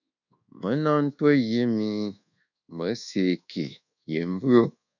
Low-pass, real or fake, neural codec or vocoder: 7.2 kHz; fake; codec, 24 kHz, 1.2 kbps, DualCodec